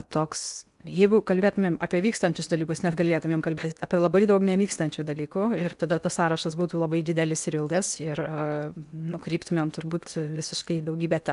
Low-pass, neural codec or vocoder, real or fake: 10.8 kHz; codec, 16 kHz in and 24 kHz out, 0.8 kbps, FocalCodec, streaming, 65536 codes; fake